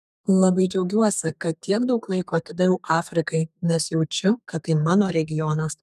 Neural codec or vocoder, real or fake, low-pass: codec, 32 kHz, 1.9 kbps, SNAC; fake; 14.4 kHz